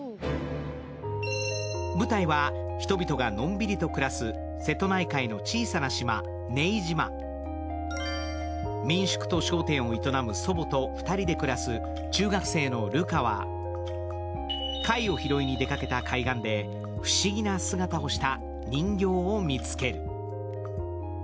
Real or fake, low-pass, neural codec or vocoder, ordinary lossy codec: real; none; none; none